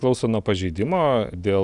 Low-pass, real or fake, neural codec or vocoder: 10.8 kHz; real; none